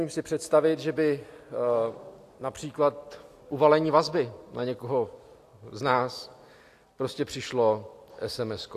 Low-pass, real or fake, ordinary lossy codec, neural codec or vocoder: 14.4 kHz; real; AAC, 64 kbps; none